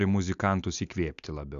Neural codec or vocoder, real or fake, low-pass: none; real; 7.2 kHz